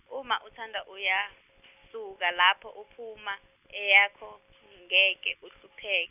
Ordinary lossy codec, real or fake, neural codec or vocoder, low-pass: none; real; none; 3.6 kHz